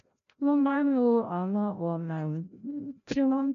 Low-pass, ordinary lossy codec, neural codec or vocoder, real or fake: 7.2 kHz; none; codec, 16 kHz, 0.5 kbps, FreqCodec, larger model; fake